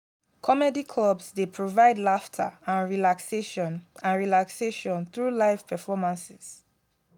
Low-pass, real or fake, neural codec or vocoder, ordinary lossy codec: 19.8 kHz; real; none; none